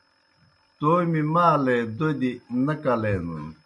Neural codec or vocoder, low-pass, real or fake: none; 10.8 kHz; real